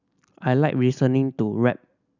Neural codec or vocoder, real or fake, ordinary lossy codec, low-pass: none; real; none; 7.2 kHz